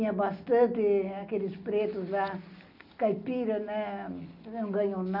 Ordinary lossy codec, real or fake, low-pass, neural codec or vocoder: none; real; 5.4 kHz; none